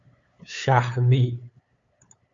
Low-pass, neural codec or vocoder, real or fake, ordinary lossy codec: 7.2 kHz; codec, 16 kHz, 8 kbps, FunCodec, trained on LibriTTS, 25 frames a second; fake; Opus, 64 kbps